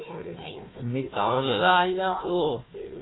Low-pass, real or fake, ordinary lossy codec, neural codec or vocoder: 7.2 kHz; fake; AAC, 16 kbps; codec, 16 kHz, 1 kbps, FunCodec, trained on Chinese and English, 50 frames a second